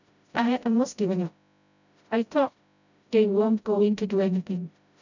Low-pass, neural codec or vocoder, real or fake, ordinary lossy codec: 7.2 kHz; codec, 16 kHz, 0.5 kbps, FreqCodec, smaller model; fake; none